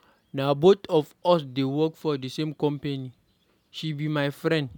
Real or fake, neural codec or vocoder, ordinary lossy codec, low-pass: real; none; none; 19.8 kHz